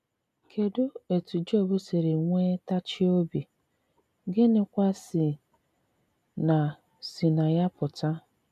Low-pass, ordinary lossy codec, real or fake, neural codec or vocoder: 14.4 kHz; none; real; none